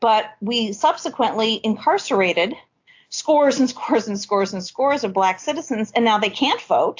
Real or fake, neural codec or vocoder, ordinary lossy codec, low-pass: real; none; AAC, 48 kbps; 7.2 kHz